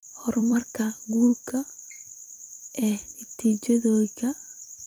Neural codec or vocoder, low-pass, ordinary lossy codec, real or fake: vocoder, 44.1 kHz, 128 mel bands every 256 samples, BigVGAN v2; 19.8 kHz; none; fake